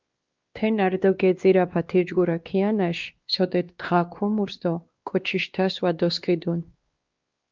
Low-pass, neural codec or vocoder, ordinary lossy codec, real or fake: 7.2 kHz; codec, 16 kHz, 2 kbps, X-Codec, WavLM features, trained on Multilingual LibriSpeech; Opus, 32 kbps; fake